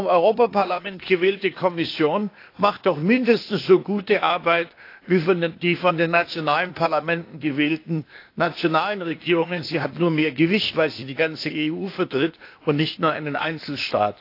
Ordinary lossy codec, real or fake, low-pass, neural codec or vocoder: AAC, 32 kbps; fake; 5.4 kHz; codec, 16 kHz, 0.8 kbps, ZipCodec